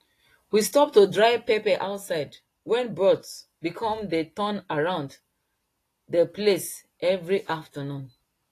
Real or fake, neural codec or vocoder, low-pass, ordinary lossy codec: real; none; 14.4 kHz; AAC, 48 kbps